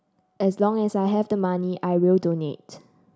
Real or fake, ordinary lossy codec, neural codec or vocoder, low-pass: real; none; none; none